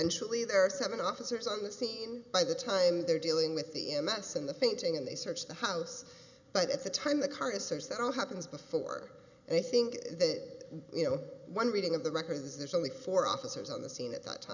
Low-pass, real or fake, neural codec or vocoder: 7.2 kHz; real; none